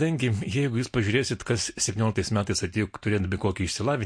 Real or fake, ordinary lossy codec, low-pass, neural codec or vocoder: real; MP3, 48 kbps; 9.9 kHz; none